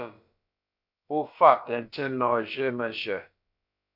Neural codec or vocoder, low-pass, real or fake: codec, 16 kHz, about 1 kbps, DyCAST, with the encoder's durations; 5.4 kHz; fake